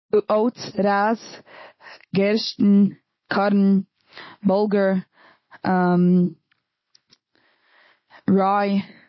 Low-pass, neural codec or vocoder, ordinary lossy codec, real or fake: 7.2 kHz; none; MP3, 24 kbps; real